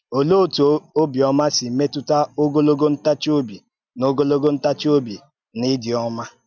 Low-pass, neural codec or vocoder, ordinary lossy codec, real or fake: 7.2 kHz; none; none; real